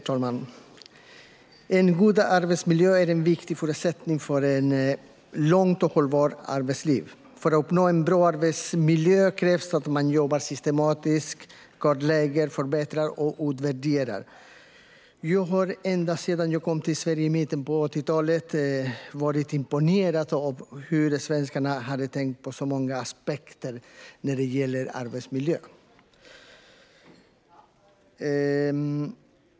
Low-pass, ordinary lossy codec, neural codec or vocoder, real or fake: none; none; none; real